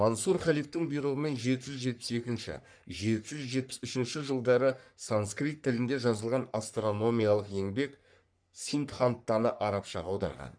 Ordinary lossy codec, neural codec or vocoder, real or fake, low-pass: none; codec, 44.1 kHz, 3.4 kbps, Pupu-Codec; fake; 9.9 kHz